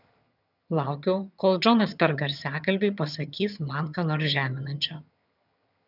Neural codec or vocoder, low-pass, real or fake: vocoder, 22.05 kHz, 80 mel bands, HiFi-GAN; 5.4 kHz; fake